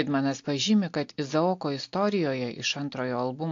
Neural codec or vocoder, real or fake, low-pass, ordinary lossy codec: none; real; 7.2 kHz; AAC, 48 kbps